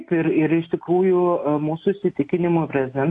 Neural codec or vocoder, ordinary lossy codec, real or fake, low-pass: none; AAC, 48 kbps; real; 10.8 kHz